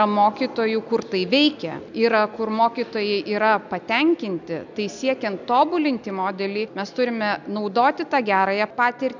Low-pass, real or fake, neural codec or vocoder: 7.2 kHz; real; none